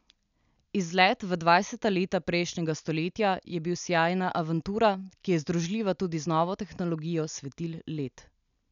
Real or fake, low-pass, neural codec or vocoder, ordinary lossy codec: real; 7.2 kHz; none; none